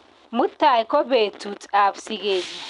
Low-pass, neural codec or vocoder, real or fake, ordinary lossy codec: 10.8 kHz; none; real; none